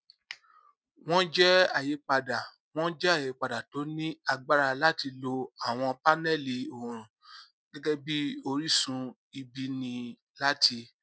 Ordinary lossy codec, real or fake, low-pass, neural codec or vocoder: none; real; none; none